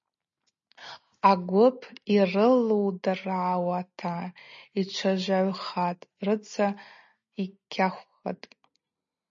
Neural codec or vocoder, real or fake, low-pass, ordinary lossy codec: none; real; 7.2 kHz; MP3, 32 kbps